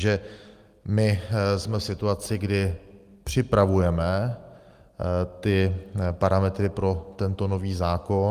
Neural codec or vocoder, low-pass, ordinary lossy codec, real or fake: none; 14.4 kHz; Opus, 32 kbps; real